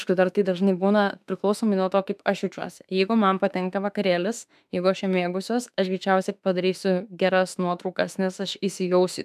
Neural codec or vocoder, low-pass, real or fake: autoencoder, 48 kHz, 32 numbers a frame, DAC-VAE, trained on Japanese speech; 14.4 kHz; fake